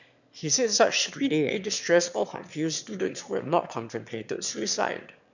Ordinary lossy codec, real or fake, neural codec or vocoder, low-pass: none; fake; autoencoder, 22.05 kHz, a latent of 192 numbers a frame, VITS, trained on one speaker; 7.2 kHz